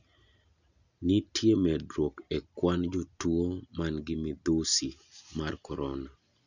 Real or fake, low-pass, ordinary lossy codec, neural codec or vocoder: real; 7.2 kHz; none; none